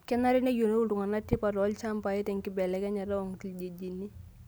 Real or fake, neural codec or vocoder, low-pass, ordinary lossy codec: real; none; none; none